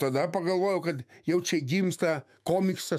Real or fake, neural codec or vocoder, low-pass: fake; autoencoder, 48 kHz, 128 numbers a frame, DAC-VAE, trained on Japanese speech; 14.4 kHz